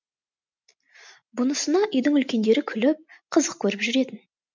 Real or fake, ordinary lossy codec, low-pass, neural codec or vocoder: real; MP3, 64 kbps; 7.2 kHz; none